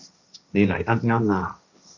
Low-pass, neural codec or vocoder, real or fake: 7.2 kHz; codec, 16 kHz, 1.1 kbps, Voila-Tokenizer; fake